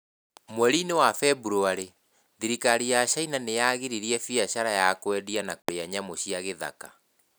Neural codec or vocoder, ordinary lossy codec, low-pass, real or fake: none; none; none; real